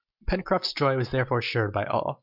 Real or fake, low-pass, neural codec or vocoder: real; 5.4 kHz; none